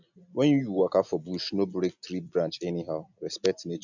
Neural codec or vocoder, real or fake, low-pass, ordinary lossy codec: none; real; 7.2 kHz; none